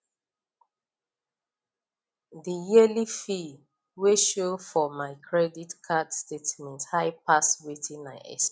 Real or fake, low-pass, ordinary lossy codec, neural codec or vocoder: real; none; none; none